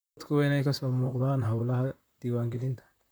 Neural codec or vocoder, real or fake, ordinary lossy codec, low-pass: vocoder, 44.1 kHz, 128 mel bands, Pupu-Vocoder; fake; none; none